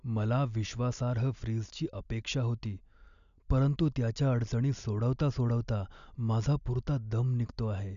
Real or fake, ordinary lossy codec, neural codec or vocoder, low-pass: real; none; none; 7.2 kHz